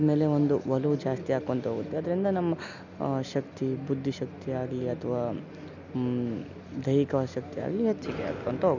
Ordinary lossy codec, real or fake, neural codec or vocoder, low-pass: none; real; none; 7.2 kHz